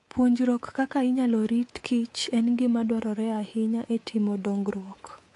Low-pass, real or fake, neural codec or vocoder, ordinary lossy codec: 10.8 kHz; fake; codec, 24 kHz, 3.1 kbps, DualCodec; AAC, 48 kbps